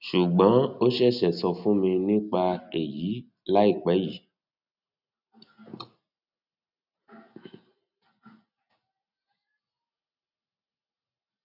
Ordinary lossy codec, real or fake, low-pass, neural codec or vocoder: none; real; 5.4 kHz; none